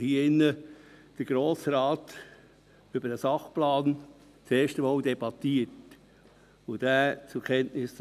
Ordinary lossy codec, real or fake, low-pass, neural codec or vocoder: none; real; 14.4 kHz; none